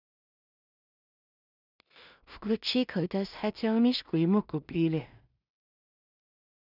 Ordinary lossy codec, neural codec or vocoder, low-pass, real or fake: none; codec, 16 kHz in and 24 kHz out, 0.4 kbps, LongCat-Audio-Codec, two codebook decoder; 5.4 kHz; fake